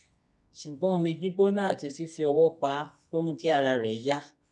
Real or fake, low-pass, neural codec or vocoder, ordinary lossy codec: fake; 10.8 kHz; codec, 24 kHz, 0.9 kbps, WavTokenizer, medium music audio release; none